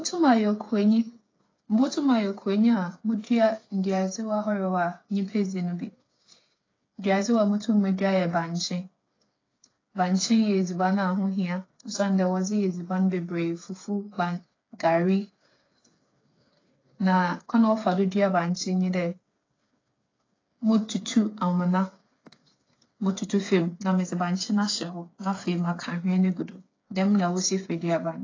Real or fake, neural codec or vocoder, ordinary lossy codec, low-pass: fake; codec, 16 kHz, 8 kbps, FreqCodec, smaller model; AAC, 32 kbps; 7.2 kHz